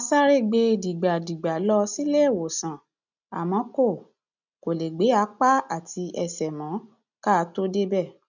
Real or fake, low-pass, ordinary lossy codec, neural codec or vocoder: real; 7.2 kHz; none; none